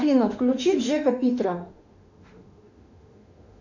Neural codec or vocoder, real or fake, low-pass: autoencoder, 48 kHz, 32 numbers a frame, DAC-VAE, trained on Japanese speech; fake; 7.2 kHz